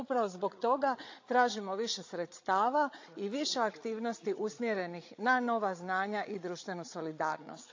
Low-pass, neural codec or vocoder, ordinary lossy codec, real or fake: 7.2 kHz; codec, 16 kHz, 16 kbps, FreqCodec, larger model; AAC, 48 kbps; fake